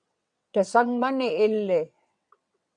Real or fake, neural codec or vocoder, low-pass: fake; vocoder, 22.05 kHz, 80 mel bands, WaveNeXt; 9.9 kHz